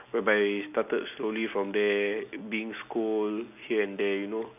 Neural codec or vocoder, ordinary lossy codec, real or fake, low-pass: none; none; real; 3.6 kHz